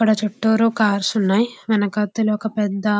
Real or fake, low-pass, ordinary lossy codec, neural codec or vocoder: real; none; none; none